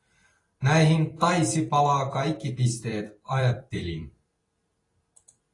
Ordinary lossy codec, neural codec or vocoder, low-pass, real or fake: AAC, 32 kbps; vocoder, 44.1 kHz, 128 mel bands every 256 samples, BigVGAN v2; 10.8 kHz; fake